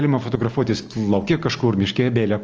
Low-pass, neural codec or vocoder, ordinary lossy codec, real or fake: 7.2 kHz; none; Opus, 24 kbps; real